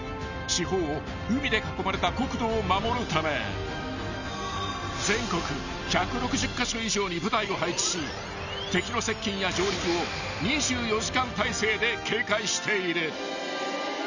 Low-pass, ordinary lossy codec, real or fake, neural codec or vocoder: 7.2 kHz; none; real; none